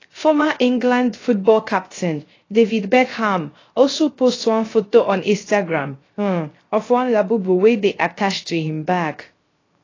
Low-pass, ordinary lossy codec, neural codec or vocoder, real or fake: 7.2 kHz; AAC, 32 kbps; codec, 16 kHz, 0.3 kbps, FocalCodec; fake